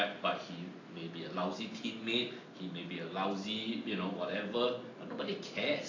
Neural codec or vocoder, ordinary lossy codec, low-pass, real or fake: none; AAC, 32 kbps; 7.2 kHz; real